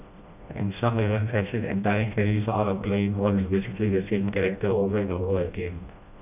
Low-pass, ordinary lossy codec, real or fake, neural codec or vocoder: 3.6 kHz; none; fake; codec, 16 kHz, 1 kbps, FreqCodec, smaller model